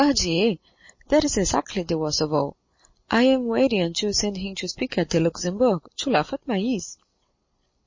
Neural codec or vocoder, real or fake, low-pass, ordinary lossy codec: none; real; 7.2 kHz; MP3, 32 kbps